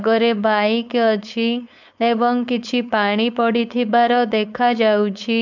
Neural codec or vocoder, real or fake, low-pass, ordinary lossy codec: codec, 16 kHz, 4.8 kbps, FACodec; fake; 7.2 kHz; none